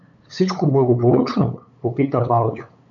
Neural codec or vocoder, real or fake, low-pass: codec, 16 kHz, 8 kbps, FunCodec, trained on LibriTTS, 25 frames a second; fake; 7.2 kHz